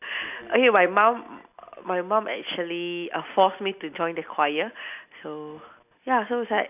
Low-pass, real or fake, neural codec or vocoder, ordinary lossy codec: 3.6 kHz; real; none; none